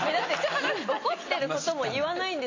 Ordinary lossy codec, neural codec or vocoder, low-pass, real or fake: AAC, 32 kbps; none; 7.2 kHz; real